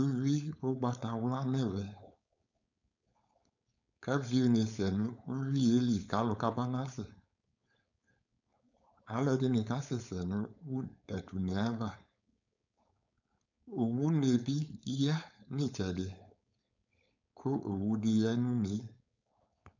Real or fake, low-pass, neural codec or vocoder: fake; 7.2 kHz; codec, 16 kHz, 4.8 kbps, FACodec